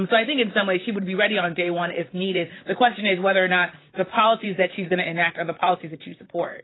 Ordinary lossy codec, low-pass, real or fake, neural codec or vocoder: AAC, 16 kbps; 7.2 kHz; fake; vocoder, 44.1 kHz, 128 mel bands, Pupu-Vocoder